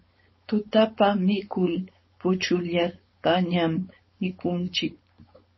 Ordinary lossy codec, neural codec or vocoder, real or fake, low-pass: MP3, 24 kbps; codec, 16 kHz, 4.8 kbps, FACodec; fake; 7.2 kHz